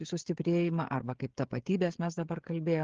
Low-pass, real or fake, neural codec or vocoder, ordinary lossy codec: 7.2 kHz; fake; codec, 16 kHz, 16 kbps, FreqCodec, smaller model; Opus, 16 kbps